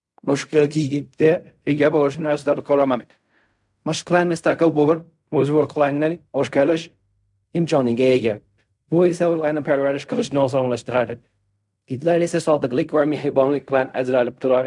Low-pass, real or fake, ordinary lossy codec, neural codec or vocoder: 10.8 kHz; fake; none; codec, 16 kHz in and 24 kHz out, 0.4 kbps, LongCat-Audio-Codec, fine tuned four codebook decoder